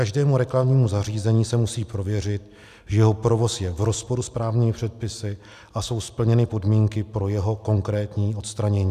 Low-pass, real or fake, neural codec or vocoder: 14.4 kHz; real; none